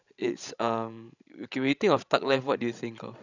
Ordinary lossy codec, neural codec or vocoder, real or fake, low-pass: none; codec, 16 kHz, 16 kbps, FreqCodec, larger model; fake; 7.2 kHz